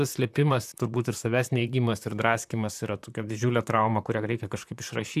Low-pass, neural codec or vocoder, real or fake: 14.4 kHz; vocoder, 44.1 kHz, 128 mel bands, Pupu-Vocoder; fake